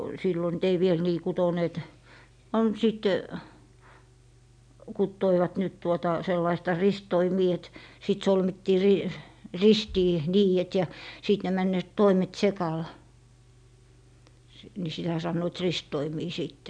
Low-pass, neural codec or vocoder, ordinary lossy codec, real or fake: 9.9 kHz; none; none; real